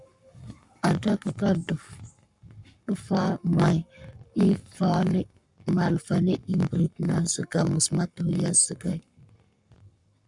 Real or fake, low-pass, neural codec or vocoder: fake; 10.8 kHz; codec, 44.1 kHz, 7.8 kbps, Pupu-Codec